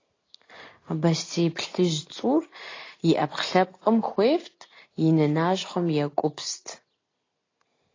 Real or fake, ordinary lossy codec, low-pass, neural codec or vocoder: real; AAC, 32 kbps; 7.2 kHz; none